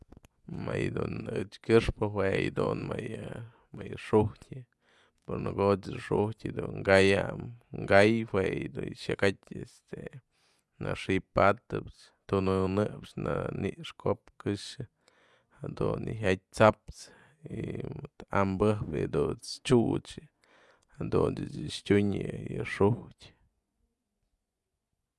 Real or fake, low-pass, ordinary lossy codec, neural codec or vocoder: fake; none; none; vocoder, 24 kHz, 100 mel bands, Vocos